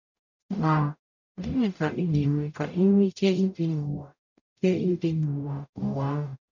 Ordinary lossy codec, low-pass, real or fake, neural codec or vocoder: none; 7.2 kHz; fake; codec, 44.1 kHz, 0.9 kbps, DAC